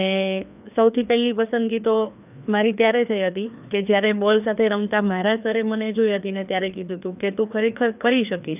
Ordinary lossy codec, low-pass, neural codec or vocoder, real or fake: none; 3.6 kHz; codec, 16 kHz, 2 kbps, FreqCodec, larger model; fake